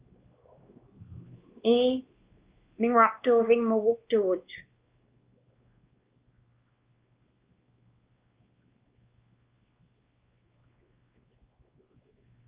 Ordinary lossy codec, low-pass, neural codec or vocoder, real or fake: Opus, 32 kbps; 3.6 kHz; codec, 16 kHz, 2 kbps, X-Codec, WavLM features, trained on Multilingual LibriSpeech; fake